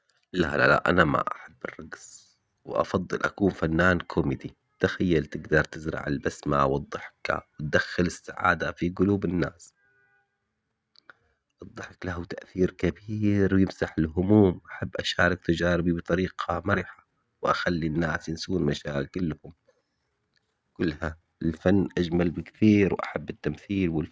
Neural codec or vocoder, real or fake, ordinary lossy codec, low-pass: none; real; none; none